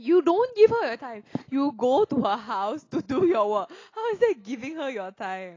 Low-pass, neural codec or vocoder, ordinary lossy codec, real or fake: 7.2 kHz; none; AAC, 32 kbps; real